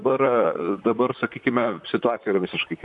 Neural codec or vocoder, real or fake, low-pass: vocoder, 44.1 kHz, 128 mel bands, Pupu-Vocoder; fake; 10.8 kHz